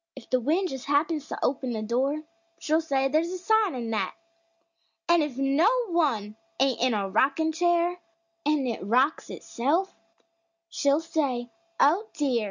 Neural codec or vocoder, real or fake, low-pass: none; real; 7.2 kHz